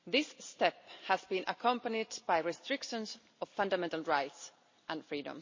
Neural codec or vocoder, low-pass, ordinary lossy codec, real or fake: none; 7.2 kHz; MP3, 32 kbps; real